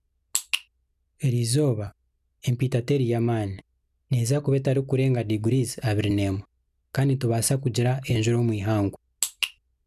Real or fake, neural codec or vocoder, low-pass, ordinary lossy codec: real; none; 14.4 kHz; none